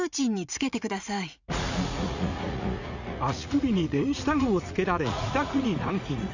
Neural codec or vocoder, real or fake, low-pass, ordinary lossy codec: vocoder, 44.1 kHz, 80 mel bands, Vocos; fake; 7.2 kHz; none